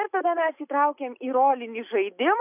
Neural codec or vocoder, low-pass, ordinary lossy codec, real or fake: none; 3.6 kHz; AAC, 32 kbps; real